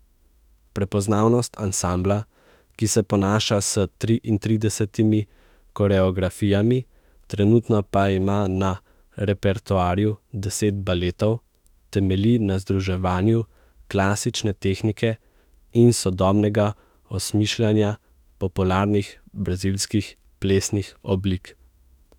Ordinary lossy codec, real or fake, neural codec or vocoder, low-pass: none; fake; autoencoder, 48 kHz, 32 numbers a frame, DAC-VAE, trained on Japanese speech; 19.8 kHz